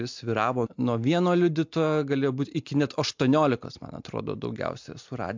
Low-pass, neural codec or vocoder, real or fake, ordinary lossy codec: 7.2 kHz; none; real; MP3, 64 kbps